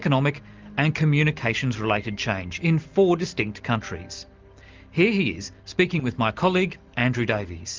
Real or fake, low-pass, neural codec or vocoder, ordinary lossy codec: real; 7.2 kHz; none; Opus, 32 kbps